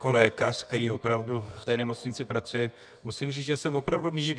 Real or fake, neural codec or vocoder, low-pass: fake; codec, 24 kHz, 0.9 kbps, WavTokenizer, medium music audio release; 9.9 kHz